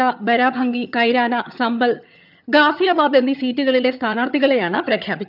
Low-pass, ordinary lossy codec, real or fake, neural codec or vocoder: 5.4 kHz; none; fake; vocoder, 22.05 kHz, 80 mel bands, HiFi-GAN